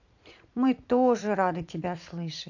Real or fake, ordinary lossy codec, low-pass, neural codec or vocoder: fake; AAC, 48 kbps; 7.2 kHz; vocoder, 44.1 kHz, 80 mel bands, Vocos